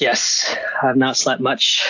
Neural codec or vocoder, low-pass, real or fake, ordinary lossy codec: none; 7.2 kHz; real; AAC, 48 kbps